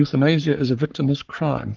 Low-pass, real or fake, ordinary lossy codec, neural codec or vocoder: 7.2 kHz; fake; Opus, 32 kbps; codec, 44.1 kHz, 3.4 kbps, Pupu-Codec